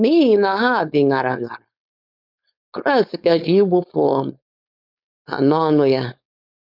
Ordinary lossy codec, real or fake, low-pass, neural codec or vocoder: none; fake; 5.4 kHz; codec, 16 kHz, 4.8 kbps, FACodec